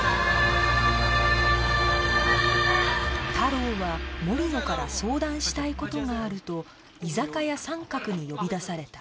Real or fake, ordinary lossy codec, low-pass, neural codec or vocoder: real; none; none; none